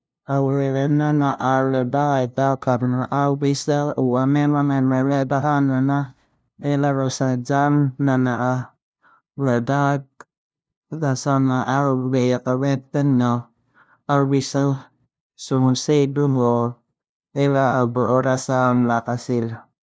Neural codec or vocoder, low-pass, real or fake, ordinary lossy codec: codec, 16 kHz, 0.5 kbps, FunCodec, trained on LibriTTS, 25 frames a second; none; fake; none